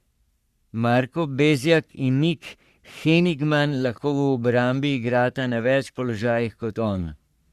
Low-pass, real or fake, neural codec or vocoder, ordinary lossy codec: 14.4 kHz; fake; codec, 44.1 kHz, 3.4 kbps, Pupu-Codec; Opus, 64 kbps